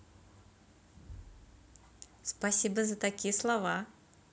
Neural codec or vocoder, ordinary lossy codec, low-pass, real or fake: none; none; none; real